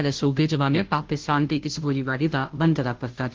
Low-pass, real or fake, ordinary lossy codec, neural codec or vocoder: 7.2 kHz; fake; Opus, 16 kbps; codec, 16 kHz, 0.5 kbps, FunCodec, trained on Chinese and English, 25 frames a second